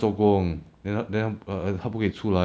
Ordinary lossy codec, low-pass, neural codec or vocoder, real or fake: none; none; none; real